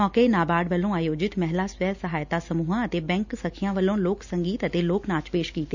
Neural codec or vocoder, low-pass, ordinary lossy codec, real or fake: none; 7.2 kHz; none; real